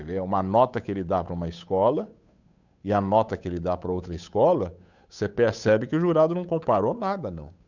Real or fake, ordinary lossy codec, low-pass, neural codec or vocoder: fake; none; 7.2 kHz; codec, 16 kHz, 8 kbps, FunCodec, trained on Chinese and English, 25 frames a second